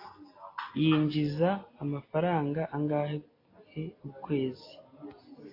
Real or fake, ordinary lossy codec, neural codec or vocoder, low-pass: real; AAC, 24 kbps; none; 5.4 kHz